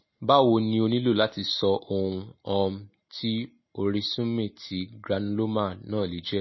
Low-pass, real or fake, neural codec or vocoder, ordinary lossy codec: 7.2 kHz; real; none; MP3, 24 kbps